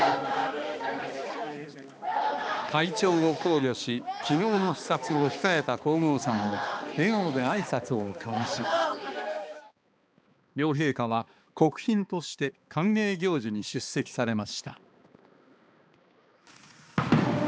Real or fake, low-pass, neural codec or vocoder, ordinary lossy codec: fake; none; codec, 16 kHz, 2 kbps, X-Codec, HuBERT features, trained on balanced general audio; none